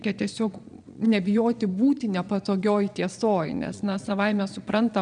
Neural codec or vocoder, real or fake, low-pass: vocoder, 22.05 kHz, 80 mel bands, Vocos; fake; 9.9 kHz